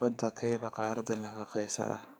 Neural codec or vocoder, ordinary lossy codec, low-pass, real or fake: codec, 44.1 kHz, 2.6 kbps, SNAC; none; none; fake